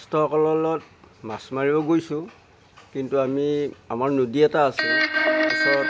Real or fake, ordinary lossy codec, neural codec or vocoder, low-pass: real; none; none; none